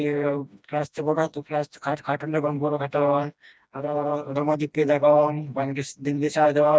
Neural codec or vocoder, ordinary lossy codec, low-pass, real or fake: codec, 16 kHz, 1 kbps, FreqCodec, smaller model; none; none; fake